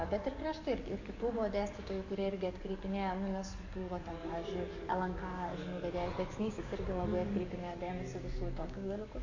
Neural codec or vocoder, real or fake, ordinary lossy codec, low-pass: codec, 44.1 kHz, 7.8 kbps, DAC; fake; AAC, 48 kbps; 7.2 kHz